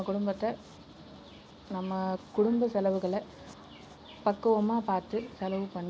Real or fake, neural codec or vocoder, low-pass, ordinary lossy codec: real; none; none; none